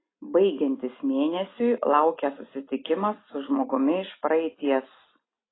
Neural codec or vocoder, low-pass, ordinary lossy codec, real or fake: none; 7.2 kHz; AAC, 16 kbps; real